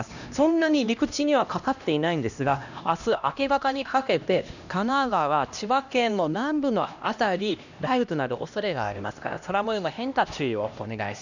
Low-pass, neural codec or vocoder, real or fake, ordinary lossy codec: 7.2 kHz; codec, 16 kHz, 1 kbps, X-Codec, HuBERT features, trained on LibriSpeech; fake; none